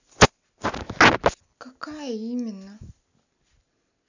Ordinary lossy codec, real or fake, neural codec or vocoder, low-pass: none; real; none; 7.2 kHz